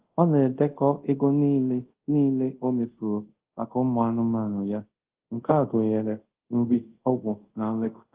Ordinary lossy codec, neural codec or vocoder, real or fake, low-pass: Opus, 16 kbps; codec, 24 kHz, 0.5 kbps, DualCodec; fake; 3.6 kHz